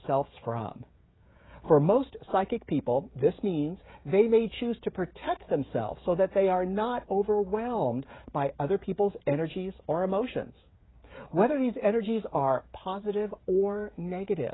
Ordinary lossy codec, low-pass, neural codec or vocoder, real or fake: AAC, 16 kbps; 7.2 kHz; codec, 16 kHz, 16 kbps, FreqCodec, smaller model; fake